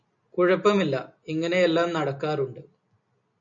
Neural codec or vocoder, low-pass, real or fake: none; 7.2 kHz; real